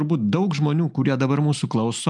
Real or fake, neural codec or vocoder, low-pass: real; none; 10.8 kHz